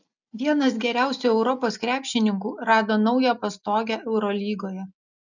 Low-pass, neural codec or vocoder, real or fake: 7.2 kHz; none; real